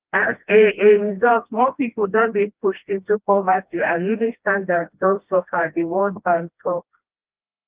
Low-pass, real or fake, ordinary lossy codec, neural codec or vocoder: 3.6 kHz; fake; Opus, 32 kbps; codec, 16 kHz, 1 kbps, FreqCodec, smaller model